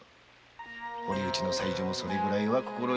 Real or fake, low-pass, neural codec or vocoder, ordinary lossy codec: real; none; none; none